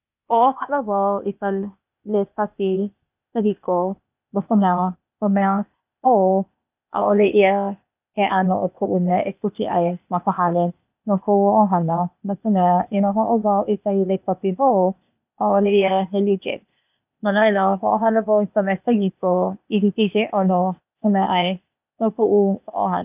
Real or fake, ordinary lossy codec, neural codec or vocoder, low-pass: fake; none; codec, 16 kHz, 0.8 kbps, ZipCodec; 3.6 kHz